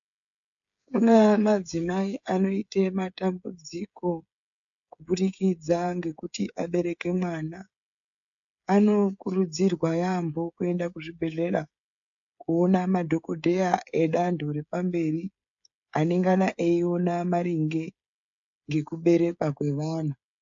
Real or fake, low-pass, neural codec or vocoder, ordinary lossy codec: fake; 7.2 kHz; codec, 16 kHz, 16 kbps, FreqCodec, smaller model; AAC, 64 kbps